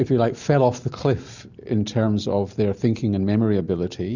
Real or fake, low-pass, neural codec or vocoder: real; 7.2 kHz; none